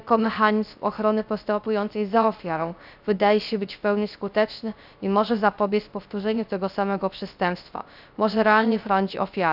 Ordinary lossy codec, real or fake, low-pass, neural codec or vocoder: none; fake; 5.4 kHz; codec, 16 kHz, 0.3 kbps, FocalCodec